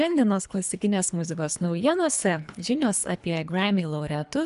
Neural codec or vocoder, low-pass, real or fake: codec, 24 kHz, 3 kbps, HILCodec; 10.8 kHz; fake